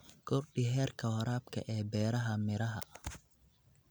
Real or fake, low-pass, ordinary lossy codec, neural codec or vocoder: real; none; none; none